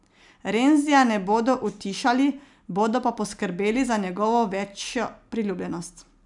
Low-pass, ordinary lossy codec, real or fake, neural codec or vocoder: 10.8 kHz; none; real; none